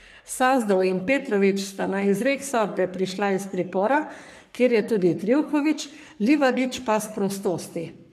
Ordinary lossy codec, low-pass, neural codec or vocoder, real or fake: none; 14.4 kHz; codec, 44.1 kHz, 3.4 kbps, Pupu-Codec; fake